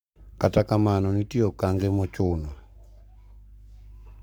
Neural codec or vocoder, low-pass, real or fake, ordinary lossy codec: codec, 44.1 kHz, 7.8 kbps, Pupu-Codec; none; fake; none